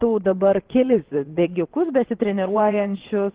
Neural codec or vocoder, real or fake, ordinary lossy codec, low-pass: vocoder, 22.05 kHz, 80 mel bands, WaveNeXt; fake; Opus, 16 kbps; 3.6 kHz